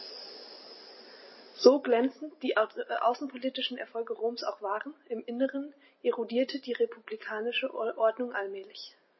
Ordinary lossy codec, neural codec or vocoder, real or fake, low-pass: MP3, 24 kbps; none; real; 7.2 kHz